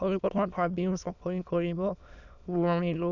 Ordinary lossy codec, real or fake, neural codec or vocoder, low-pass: none; fake; autoencoder, 22.05 kHz, a latent of 192 numbers a frame, VITS, trained on many speakers; 7.2 kHz